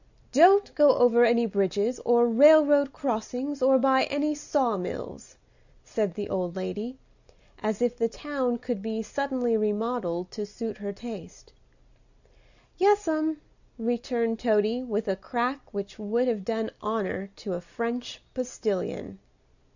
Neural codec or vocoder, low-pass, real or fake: none; 7.2 kHz; real